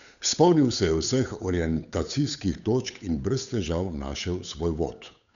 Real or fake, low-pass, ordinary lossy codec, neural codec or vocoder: fake; 7.2 kHz; none; codec, 16 kHz, 8 kbps, FunCodec, trained on Chinese and English, 25 frames a second